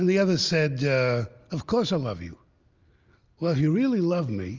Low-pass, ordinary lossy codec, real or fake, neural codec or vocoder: 7.2 kHz; Opus, 32 kbps; real; none